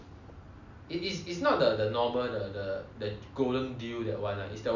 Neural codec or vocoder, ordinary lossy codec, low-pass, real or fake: none; Opus, 64 kbps; 7.2 kHz; real